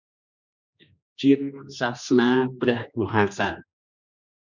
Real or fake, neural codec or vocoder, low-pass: fake; codec, 16 kHz, 2 kbps, X-Codec, HuBERT features, trained on general audio; 7.2 kHz